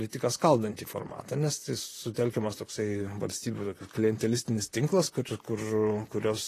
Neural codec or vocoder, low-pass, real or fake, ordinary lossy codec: vocoder, 44.1 kHz, 128 mel bands, Pupu-Vocoder; 14.4 kHz; fake; AAC, 48 kbps